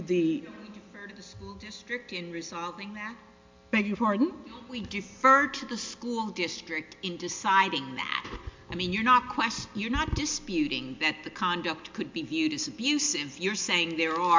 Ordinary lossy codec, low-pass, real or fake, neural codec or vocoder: MP3, 64 kbps; 7.2 kHz; real; none